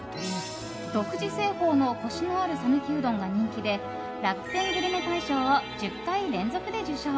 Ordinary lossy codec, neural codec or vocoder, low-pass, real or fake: none; none; none; real